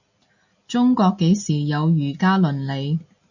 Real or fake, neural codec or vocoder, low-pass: real; none; 7.2 kHz